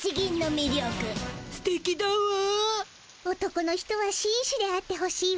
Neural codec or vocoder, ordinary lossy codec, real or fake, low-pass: none; none; real; none